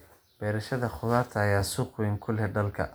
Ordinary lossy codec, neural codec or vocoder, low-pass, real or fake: none; none; none; real